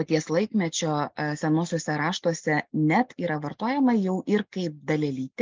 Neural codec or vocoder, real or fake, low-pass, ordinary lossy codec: none; real; 7.2 kHz; Opus, 32 kbps